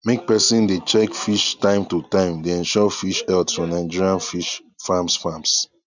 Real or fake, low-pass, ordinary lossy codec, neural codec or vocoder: real; 7.2 kHz; none; none